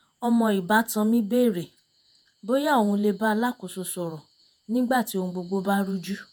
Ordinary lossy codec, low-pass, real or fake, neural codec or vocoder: none; none; fake; vocoder, 48 kHz, 128 mel bands, Vocos